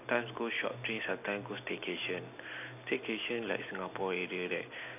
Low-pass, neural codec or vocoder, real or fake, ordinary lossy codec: 3.6 kHz; none; real; none